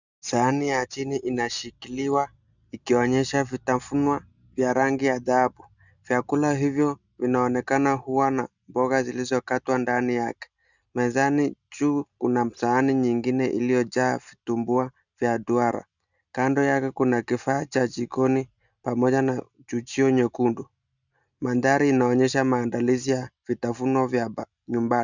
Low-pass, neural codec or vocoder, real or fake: 7.2 kHz; none; real